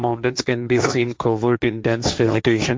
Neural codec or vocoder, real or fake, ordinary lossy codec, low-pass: codec, 16 kHz, 1.1 kbps, Voila-Tokenizer; fake; none; none